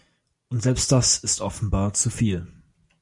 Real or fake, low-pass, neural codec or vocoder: real; 10.8 kHz; none